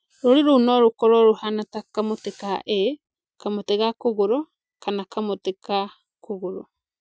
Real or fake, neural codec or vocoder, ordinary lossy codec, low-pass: real; none; none; none